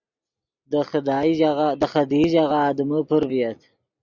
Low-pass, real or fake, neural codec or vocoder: 7.2 kHz; real; none